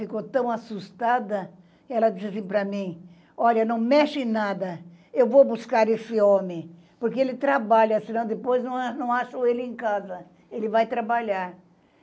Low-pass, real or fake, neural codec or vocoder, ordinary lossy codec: none; real; none; none